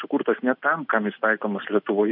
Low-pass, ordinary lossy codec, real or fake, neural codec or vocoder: 5.4 kHz; MP3, 48 kbps; real; none